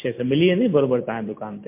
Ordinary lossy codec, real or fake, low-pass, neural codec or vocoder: MP3, 24 kbps; real; 3.6 kHz; none